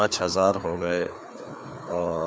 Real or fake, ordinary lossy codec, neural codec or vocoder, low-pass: fake; none; codec, 16 kHz, 4 kbps, FreqCodec, larger model; none